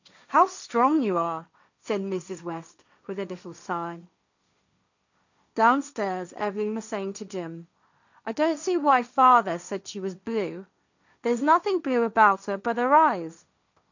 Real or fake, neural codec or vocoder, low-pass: fake; codec, 16 kHz, 1.1 kbps, Voila-Tokenizer; 7.2 kHz